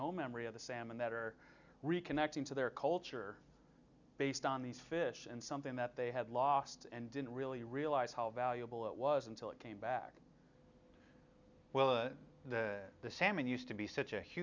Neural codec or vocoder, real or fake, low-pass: none; real; 7.2 kHz